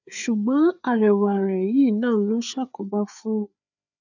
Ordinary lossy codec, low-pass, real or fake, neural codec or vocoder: none; 7.2 kHz; fake; codec, 16 kHz, 4 kbps, FreqCodec, larger model